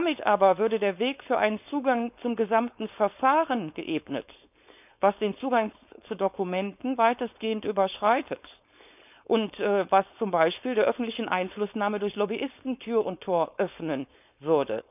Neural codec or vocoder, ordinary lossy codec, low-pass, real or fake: codec, 16 kHz, 4.8 kbps, FACodec; none; 3.6 kHz; fake